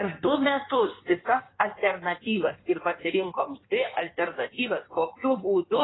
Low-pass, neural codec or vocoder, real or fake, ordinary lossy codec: 7.2 kHz; codec, 16 kHz in and 24 kHz out, 1.1 kbps, FireRedTTS-2 codec; fake; AAC, 16 kbps